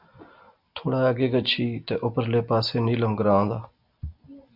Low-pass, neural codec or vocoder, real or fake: 5.4 kHz; none; real